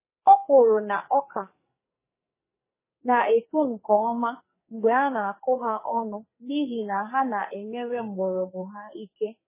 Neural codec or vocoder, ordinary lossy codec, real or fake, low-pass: codec, 44.1 kHz, 2.6 kbps, SNAC; MP3, 16 kbps; fake; 3.6 kHz